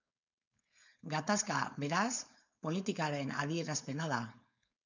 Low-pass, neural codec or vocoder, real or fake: 7.2 kHz; codec, 16 kHz, 4.8 kbps, FACodec; fake